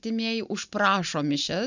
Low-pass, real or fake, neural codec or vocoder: 7.2 kHz; real; none